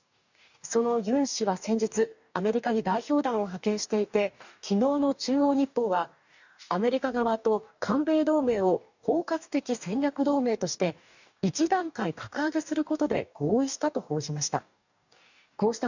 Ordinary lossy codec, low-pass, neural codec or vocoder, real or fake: none; 7.2 kHz; codec, 44.1 kHz, 2.6 kbps, DAC; fake